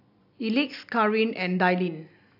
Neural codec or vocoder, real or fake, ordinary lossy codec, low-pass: none; real; AAC, 48 kbps; 5.4 kHz